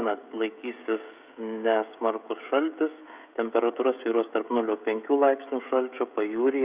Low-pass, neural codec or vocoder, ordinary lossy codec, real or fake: 3.6 kHz; codec, 16 kHz, 16 kbps, FreqCodec, smaller model; Opus, 64 kbps; fake